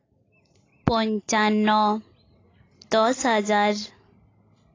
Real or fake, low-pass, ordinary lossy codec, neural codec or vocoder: real; 7.2 kHz; AAC, 32 kbps; none